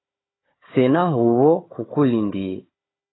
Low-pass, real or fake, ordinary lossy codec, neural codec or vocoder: 7.2 kHz; fake; AAC, 16 kbps; codec, 16 kHz, 4 kbps, FunCodec, trained on Chinese and English, 50 frames a second